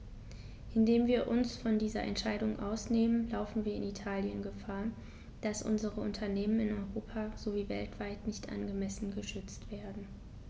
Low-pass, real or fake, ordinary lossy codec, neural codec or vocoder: none; real; none; none